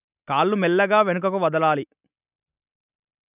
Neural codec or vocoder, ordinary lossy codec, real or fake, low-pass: none; none; real; 3.6 kHz